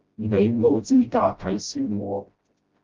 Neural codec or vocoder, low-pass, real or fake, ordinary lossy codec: codec, 16 kHz, 0.5 kbps, FreqCodec, smaller model; 7.2 kHz; fake; Opus, 32 kbps